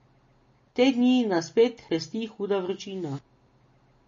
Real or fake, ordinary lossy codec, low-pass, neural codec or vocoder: real; MP3, 32 kbps; 7.2 kHz; none